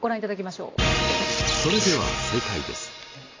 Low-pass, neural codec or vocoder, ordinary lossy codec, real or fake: 7.2 kHz; none; AAC, 32 kbps; real